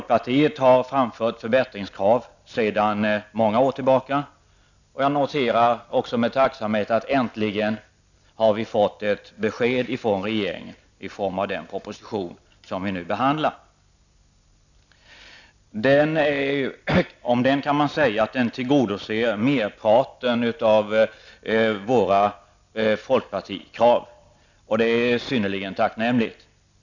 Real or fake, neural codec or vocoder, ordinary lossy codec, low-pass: real; none; none; 7.2 kHz